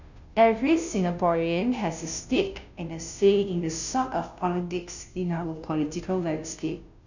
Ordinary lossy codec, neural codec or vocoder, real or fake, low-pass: none; codec, 16 kHz, 0.5 kbps, FunCodec, trained on Chinese and English, 25 frames a second; fake; 7.2 kHz